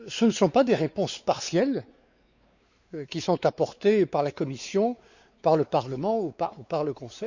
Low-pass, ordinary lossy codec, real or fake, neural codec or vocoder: 7.2 kHz; Opus, 64 kbps; fake; codec, 16 kHz, 4 kbps, X-Codec, WavLM features, trained on Multilingual LibriSpeech